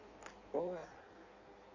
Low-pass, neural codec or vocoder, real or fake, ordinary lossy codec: 7.2 kHz; codec, 16 kHz in and 24 kHz out, 1.1 kbps, FireRedTTS-2 codec; fake; none